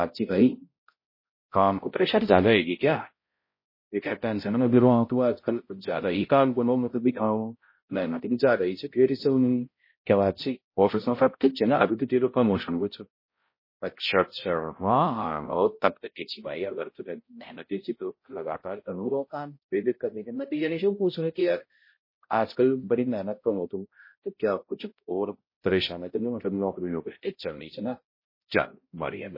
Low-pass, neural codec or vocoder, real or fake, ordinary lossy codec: 5.4 kHz; codec, 16 kHz, 0.5 kbps, X-Codec, HuBERT features, trained on balanced general audio; fake; MP3, 24 kbps